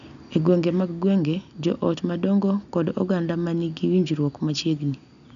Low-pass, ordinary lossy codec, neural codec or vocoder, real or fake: 7.2 kHz; none; none; real